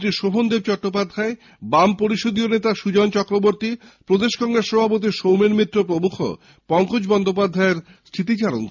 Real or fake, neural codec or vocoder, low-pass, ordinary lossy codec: real; none; 7.2 kHz; none